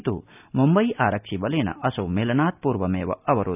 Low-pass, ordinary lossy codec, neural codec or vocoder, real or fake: 3.6 kHz; none; none; real